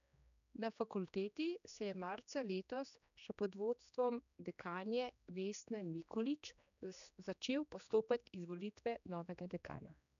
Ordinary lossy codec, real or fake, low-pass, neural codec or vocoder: none; fake; 7.2 kHz; codec, 16 kHz, 2 kbps, X-Codec, HuBERT features, trained on general audio